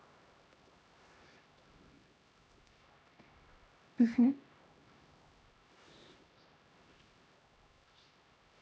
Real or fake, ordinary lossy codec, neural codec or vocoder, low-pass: fake; none; codec, 16 kHz, 1 kbps, X-Codec, HuBERT features, trained on LibriSpeech; none